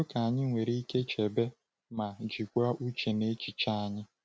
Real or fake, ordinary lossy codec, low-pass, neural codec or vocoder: real; none; none; none